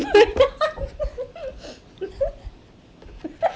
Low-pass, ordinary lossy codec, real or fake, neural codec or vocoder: none; none; real; none